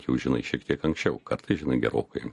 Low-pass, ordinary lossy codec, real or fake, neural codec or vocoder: 14.4 kHz; MP3, 48 kbps; real; none